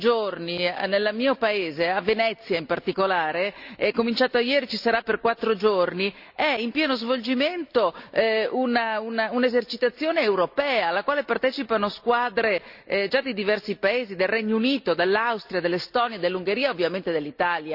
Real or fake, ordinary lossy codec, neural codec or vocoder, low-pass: real; Opus, 64 kbps; none; 5.4 kHz